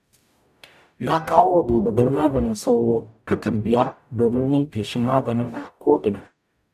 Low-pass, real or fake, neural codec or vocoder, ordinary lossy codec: 14.4 kHz; fake; codec, 44.1 kHz, 0.9 kbps, DAC; none